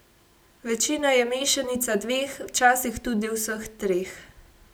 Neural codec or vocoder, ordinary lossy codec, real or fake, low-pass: none; none; real; none